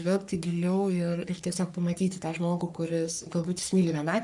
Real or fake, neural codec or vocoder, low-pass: fake; codec, 44.1 kHz, 3.4 kbps, Pupu-Codec; 10.8 kHz